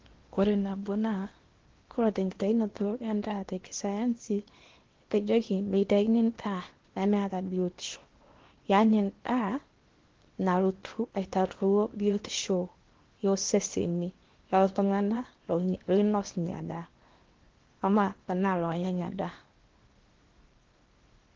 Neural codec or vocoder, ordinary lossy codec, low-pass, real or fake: codec, 16 kHz in and 24 kHz out, 0.8 kbps, FocalCodec, streaming, 65536 codes; Opus, 16 kbps; 7.2 kHz; fake